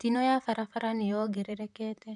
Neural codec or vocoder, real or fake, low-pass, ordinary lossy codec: vocoder, 44.1 kHz, 128 mel bands, Pupu-Vocoder; fake; 10.8 kHz; none